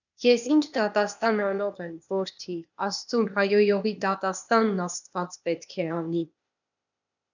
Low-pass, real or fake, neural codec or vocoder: 7.2 kHz; fake; codec, 16 kHz, 0.8 kbps, ZipCodec